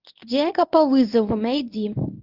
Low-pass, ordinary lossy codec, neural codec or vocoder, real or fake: 5.4 kHz; Opus, 32 kbps; codec, 24 kHz, 0.9 kbps, WavTokenizer, medium speech release version 1; fake